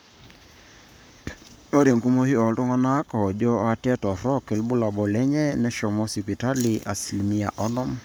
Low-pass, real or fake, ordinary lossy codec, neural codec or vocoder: none; fake; none; codec, 44.1 kHz, 7.8 kbps, Pupu-Codec